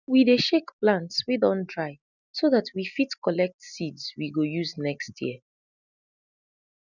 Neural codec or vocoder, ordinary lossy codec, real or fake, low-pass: none; none; real; 7.2 kHz